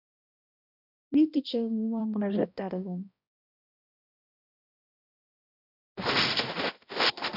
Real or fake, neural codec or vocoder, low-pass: fake; codec, 16 kHz, 1 kbps, X-Codec, HuBERT features, trained on balanced general audio; 5.4 kHz